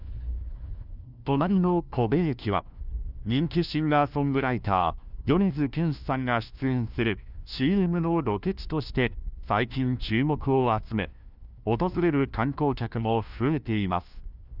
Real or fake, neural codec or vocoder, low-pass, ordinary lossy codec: fake; codec, 16 kHz, 1 kbps, FunCodec, trained on LibriTTS, 50 frames a second; 5.4 kHz; none